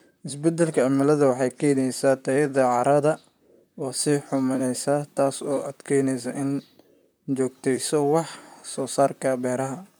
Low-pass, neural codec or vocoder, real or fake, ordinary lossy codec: none; vocoder, 44.1 kHz, 128 mel bands, Pupu-Vocoder; fake; none